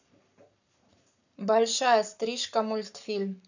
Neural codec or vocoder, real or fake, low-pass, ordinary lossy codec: codec, 44.1 kHz, 7.8 kbps, Pupu-Codec; fake; 7.2 kHz; none